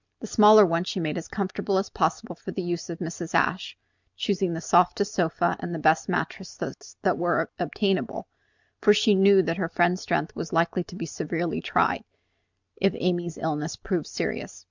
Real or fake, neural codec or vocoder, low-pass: real; none; 7.2 kHz